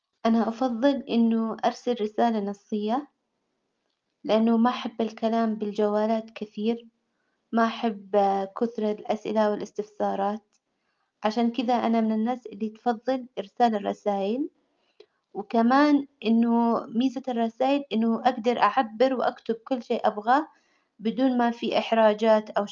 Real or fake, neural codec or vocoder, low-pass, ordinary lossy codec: real; none; 7.2 kHz; Opus, 32 kbps